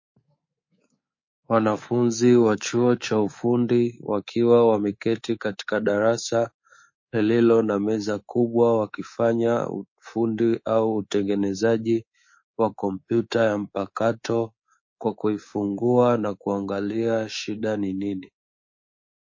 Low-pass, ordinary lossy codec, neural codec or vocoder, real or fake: 7.2 kHz; MP3, 32 kbps; codec, 24 kHz, 3.1 kbps, DualCodec; fake